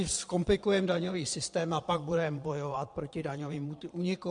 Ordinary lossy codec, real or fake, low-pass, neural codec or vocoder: MP3, 64 kbps; fake; 9.9 kHz; vocoder, 22.05 kHz, 80 mel bands, Vocos